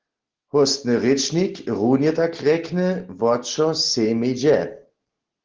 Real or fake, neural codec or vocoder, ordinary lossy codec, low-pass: real; none; Opus, 16 kbps; 7.2 kHz